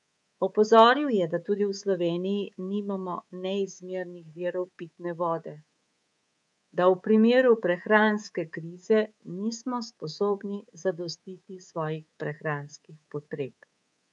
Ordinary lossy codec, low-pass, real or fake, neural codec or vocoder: none; 10.8 kHz; fake; codec, 24 kHz, 3.1 kbps, DualCodec